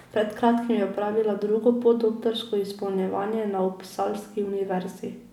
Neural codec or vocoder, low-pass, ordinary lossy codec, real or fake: none; 19.8 kHz; none; real